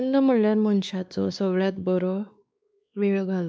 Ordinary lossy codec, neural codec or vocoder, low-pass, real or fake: none; codec, 16 kHz, 2 kbps, X-Codec, WavLM features, trained on Multilingual LibriSpeech; none; fake